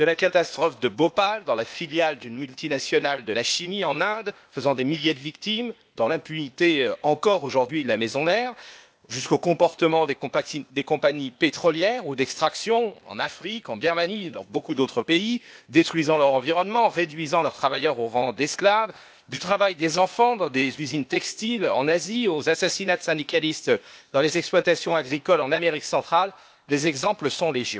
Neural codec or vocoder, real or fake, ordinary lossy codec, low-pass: codec, 16 kHz, 0.8 kbps, ZipCodec; fake; none; none